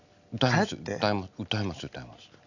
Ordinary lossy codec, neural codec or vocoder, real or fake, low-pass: none; none; real; 7.2 kHz